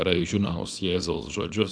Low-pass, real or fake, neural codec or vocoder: 9.9 kHz; fake; codec, 24 kHz, 0.9 kbps, WavTokenizer, small release